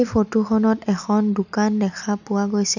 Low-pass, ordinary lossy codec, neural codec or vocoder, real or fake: 7.2 kHz; none; none; real